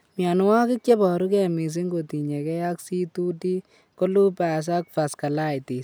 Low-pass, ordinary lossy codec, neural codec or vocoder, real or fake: none; none; none; real